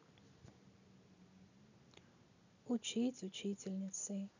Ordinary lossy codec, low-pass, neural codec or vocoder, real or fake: none; 7.2 kHz; none; real